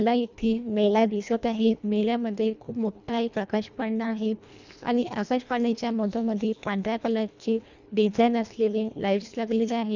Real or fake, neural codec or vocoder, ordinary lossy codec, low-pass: fake; codec, 24 kHz, 1.5 kbps, HILCodec; none; 7.2 kHz